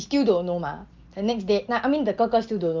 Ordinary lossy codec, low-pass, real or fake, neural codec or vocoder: Opus, 24 kbps; 7.2 kHz; real; none